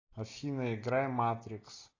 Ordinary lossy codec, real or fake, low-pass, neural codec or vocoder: AAC, 32 kbps; real; 7.2 kHz; none